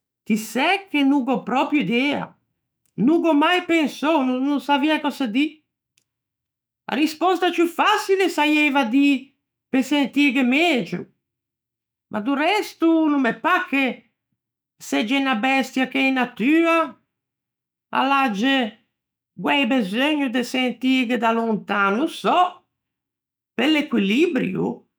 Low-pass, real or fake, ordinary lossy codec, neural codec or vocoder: none; fake; none; autoencoder, 48 kHz, 128 numbers a frame, DAC-VAE, trained on Japanese speech